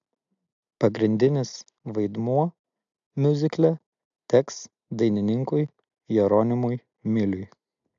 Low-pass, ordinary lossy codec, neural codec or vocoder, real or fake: 7.2 kHz; AAC, 64 kbps; none; real